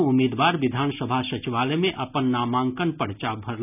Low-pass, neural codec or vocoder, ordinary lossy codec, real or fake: 3.6 kHz; none; none; real